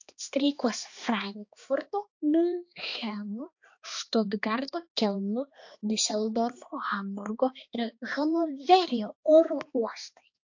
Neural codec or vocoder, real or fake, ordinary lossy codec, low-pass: codec, 16 kHz, 2 kbps, X-Codec, HuBERT features, trained on balanced general audio; fake; AAC, 48 kbps; 7.2 kHz